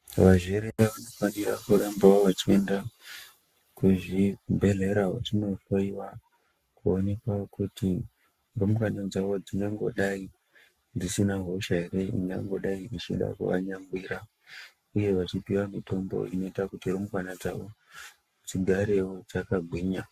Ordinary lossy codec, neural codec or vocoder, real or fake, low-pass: Opus, 64 kbps; codec, 44.1 kHz, 7.8 kbps, Pupu-Codec; fake; 14.4 kHz